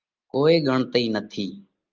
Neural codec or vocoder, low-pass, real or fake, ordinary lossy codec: none; 7.2 kHz; real; Opus, 24 kbps